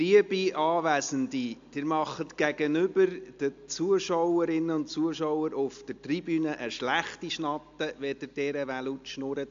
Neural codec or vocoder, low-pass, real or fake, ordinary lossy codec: none; 7.2 kHz; real; AAC, 64 kbps